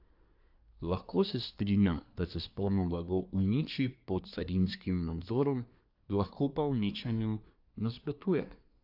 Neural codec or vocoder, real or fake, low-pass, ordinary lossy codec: codec, 24 kHz, 1 kbps, SNAC; fake; 5.4 kHz; AAC, 48 kbps